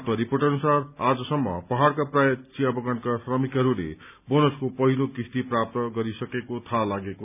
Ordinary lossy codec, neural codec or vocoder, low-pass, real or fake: none; none; 3.6 kHz; real